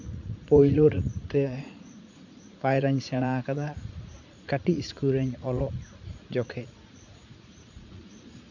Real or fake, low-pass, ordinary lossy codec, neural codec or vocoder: fake; 7.2 kHz; none; vocoder, 44.1 kHz, 80 mel bands, Vocos